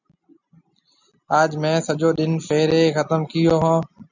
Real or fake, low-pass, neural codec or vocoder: real; 7.2 kHz; none